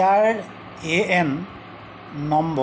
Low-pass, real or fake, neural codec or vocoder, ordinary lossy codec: none; real; none; none